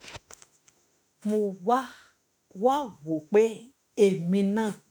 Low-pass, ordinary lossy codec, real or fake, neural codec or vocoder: none; none; fake; autoencoder, 48 kHz, 32 numbers a frame, DAC-VAE, trained on Japanese speech